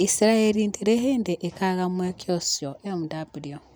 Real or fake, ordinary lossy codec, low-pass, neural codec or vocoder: real; none; none; none